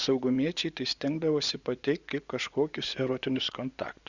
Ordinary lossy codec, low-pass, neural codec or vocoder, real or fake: Opus, 64 kbps; 7.2 kHz; vocoder, 24 kHz, 100 mel bands, Vocos; fake